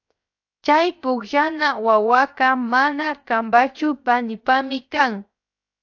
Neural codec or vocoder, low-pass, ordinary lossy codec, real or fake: codec, 16 kHz, 0.7 kbps, FocalCodec; 7.2 kHz; AAC, 48 kbps; fake